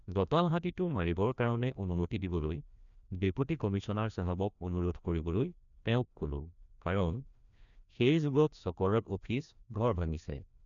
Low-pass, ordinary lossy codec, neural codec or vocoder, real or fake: 7.2 kHz; none; codec, 16 kHz, 1 kbps, FreqCodec, larger model; fake